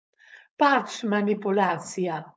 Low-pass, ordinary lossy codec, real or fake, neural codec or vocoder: none; none; fake; codec, 16 kHz, 4.8 kbps, FACodec